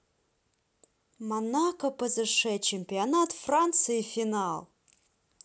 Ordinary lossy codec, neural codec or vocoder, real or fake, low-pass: none; none; real; none